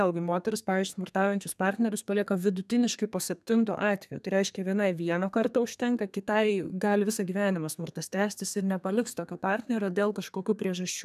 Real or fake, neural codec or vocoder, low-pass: fake; codec, 32 kHz, 1.9 kbps, SNAC; 14.4 kHz